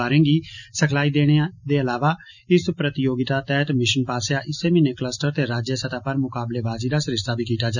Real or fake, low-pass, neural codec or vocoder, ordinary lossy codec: real; 7.2 kHz; none; none